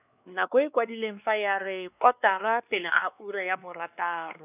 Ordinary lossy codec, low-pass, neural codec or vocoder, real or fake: none; 3.6 kHz; codec, 16 kHz, 2 kbps, X-Codec, WavLM features, trained on Multilingual LibriSpeech; fake